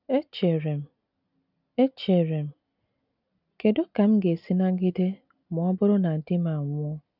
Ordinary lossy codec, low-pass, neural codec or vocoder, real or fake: none; 5.4 kHz; none; real